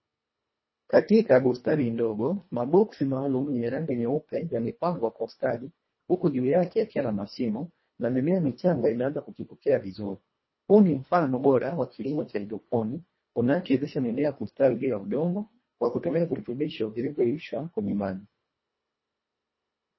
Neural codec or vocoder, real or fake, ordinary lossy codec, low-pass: codec, 24 kHz, 1.5 kbps, HILCodec; fake; MP3, 24 kbps; 7.2 kHz